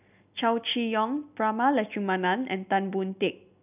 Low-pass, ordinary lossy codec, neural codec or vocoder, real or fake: 3.6 kHz; none; none; real